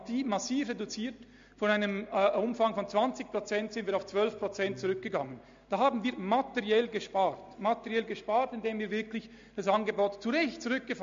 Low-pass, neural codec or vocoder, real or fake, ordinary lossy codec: 7.2 kHz; none; real; MP3, 48 kbps